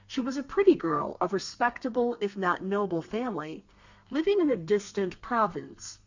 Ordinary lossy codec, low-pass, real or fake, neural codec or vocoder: Opus, 64 kbps; 7.2 kHz; fake; codec, 32 kHz, 1.9 kbps, SNAC